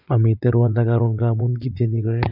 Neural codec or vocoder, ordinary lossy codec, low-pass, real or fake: vocoder, 44.1 kHz, 80 mel bands, Vocos; none; 5.4 kHz; fake